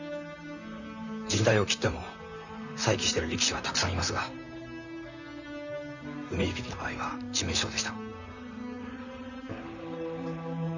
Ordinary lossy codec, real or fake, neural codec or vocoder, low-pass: none; fake; vocoder, 44.1 kHz, 128 mel bands, Pupu-Vocoder; 7.2 kHz